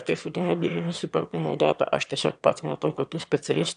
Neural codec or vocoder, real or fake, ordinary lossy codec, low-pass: autoencoder, 22.05 kHz, a latent of 192 numbers a frame, VITS, trained on one speaker; fake; AAC, 96 kbps; 9.9 kHz